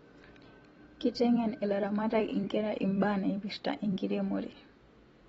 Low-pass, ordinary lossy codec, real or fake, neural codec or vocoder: 19.8 kHz; AAC, 24 kbps; fake; vocoder, 44.1 kHz, 128 mel bands every 256 samples, BigVGAN v2